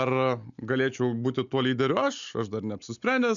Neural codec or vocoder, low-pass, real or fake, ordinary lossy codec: none; 7.2 kHz; real; MP3, 96 kbps